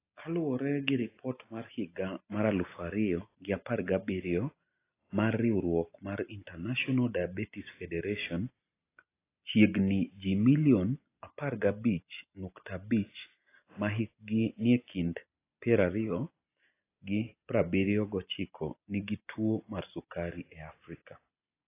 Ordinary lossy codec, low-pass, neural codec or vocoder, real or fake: AAC, 24 kbps; 3.6 kHz; none; real